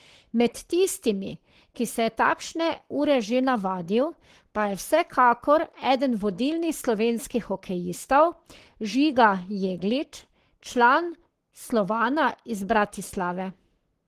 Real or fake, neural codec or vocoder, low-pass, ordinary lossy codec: fake; codec, 44.1 kHz, 7.8 kbps, Pupu-Codec; 14.4 kHz; Opus, 16 kbps